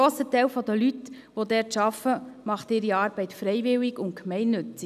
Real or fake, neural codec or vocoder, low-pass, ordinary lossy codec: real; none; 14.4 kHz; none